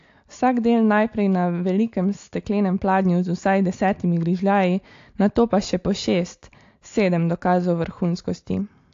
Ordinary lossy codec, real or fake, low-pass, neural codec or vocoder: AAC, 48 kbps; real; 7.2 kHz; none